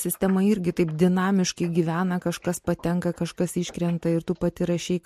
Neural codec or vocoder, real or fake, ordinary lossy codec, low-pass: none; real; MP3, 64 kbps; 14.4 kHz